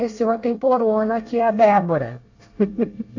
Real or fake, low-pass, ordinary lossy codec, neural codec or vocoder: fake; 7.2 kHz; AAC, 48 kbps; codec, 24 kHz, 1 kbps, SNAC